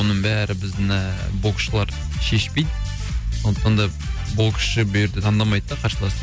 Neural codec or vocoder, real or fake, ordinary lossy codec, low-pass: none; real; none; none